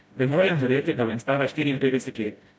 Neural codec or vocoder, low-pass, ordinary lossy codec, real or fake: codec, 16 kHz, 0.5 kbps, FreqCodec, smaller model; none; none; fake